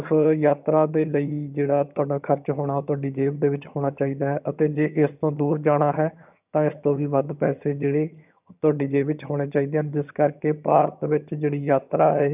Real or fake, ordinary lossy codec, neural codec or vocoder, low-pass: fake; none; vocoder, 22.05 kHz, 80 mel bands, HiFi-GAN; 3.6 kHz